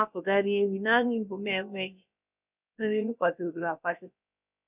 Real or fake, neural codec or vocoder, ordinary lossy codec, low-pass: fake; codec, 16 kHz, about 1 kbps, DyCAST, with the encoder's durations; none; 3.6 kHz